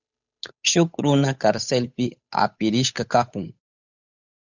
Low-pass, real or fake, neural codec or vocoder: 7.2 kHz; fake; codec, 16 kHz, 8 kbps, FunCodec, trained on Chinese and English, 25 frames a second